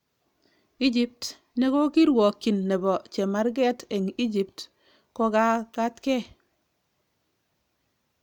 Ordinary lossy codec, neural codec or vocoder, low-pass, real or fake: none; none; 19.8 kHz; real